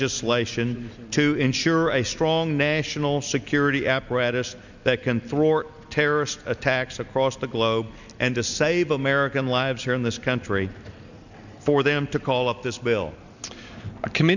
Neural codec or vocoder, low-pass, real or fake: none; 7.2 kHz; real